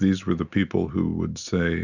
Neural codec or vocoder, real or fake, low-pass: none; real; 7.2 kHz